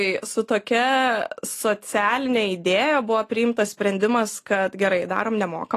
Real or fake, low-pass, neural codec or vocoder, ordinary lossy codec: real; 14.4 kHz; none; AAC, 48 kbps